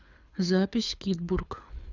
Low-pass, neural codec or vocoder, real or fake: 7.2 kHz; codec, 24 kHz, 6 kbps, HILCodec; fake